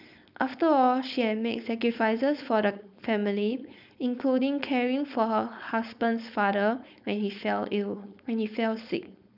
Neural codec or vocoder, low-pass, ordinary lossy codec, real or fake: codec, 16 kHz, 4.8 kbps, FACodec; 5.4 kHz; none; fake